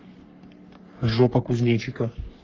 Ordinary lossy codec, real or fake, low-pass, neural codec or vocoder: Opus, 24 kbps; fake; 7.2 kHz; codec, 44.1 kHz, 3.4 kbps, Pupu-Codec